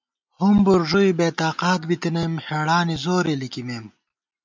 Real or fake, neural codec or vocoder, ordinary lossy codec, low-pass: real; none; MP3, 64 kbps; 7.2 kHz